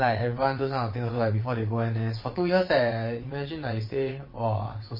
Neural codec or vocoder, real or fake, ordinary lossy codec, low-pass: vocoder, 22.05 kHz, 80 mel bands, WaveNeXt; fake; MP3, 24 kbps; 5.4 kHz